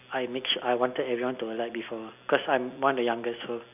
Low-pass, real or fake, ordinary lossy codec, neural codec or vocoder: 3.6 kHz; real; none; none